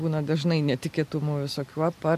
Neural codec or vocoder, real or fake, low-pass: none; real; 14.4 kHz